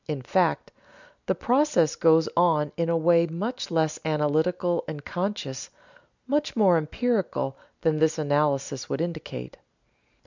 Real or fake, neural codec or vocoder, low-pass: real; none; 7.2 kHz